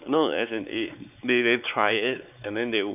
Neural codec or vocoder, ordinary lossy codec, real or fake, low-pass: codec, 16 kHz, 4 kbps, X-Codec, WavLM features, trained on Multilingual LibriSpeech; none; fake; 3.6 kHz